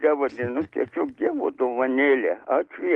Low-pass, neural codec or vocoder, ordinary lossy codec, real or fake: 10.8 kHz; none; Opus, 16 kbps; real